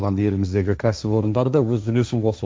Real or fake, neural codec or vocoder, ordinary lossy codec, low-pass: fake; codec, 16 kHz, 1.1 kbps, Voila-Tokenizer; none; none